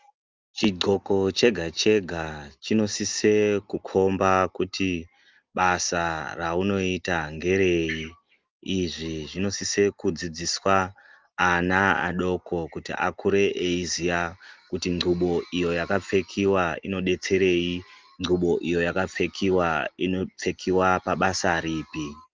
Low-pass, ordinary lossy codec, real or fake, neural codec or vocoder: 7.2 kHz; Opus, 32 kbps; real; none